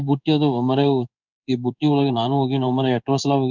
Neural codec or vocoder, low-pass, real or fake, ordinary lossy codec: codec, 16 kHz in and 24 kHz out, 1 kbps, XY-Tokenizer; 7.2 kHz; fake; none